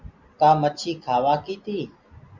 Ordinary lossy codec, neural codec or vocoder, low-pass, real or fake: Opus, 64 kbps; none; 7.2 kHz; real